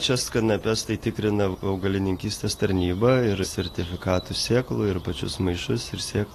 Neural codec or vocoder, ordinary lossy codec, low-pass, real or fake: none; AAC, 48 kbps; 14.4 kHz; real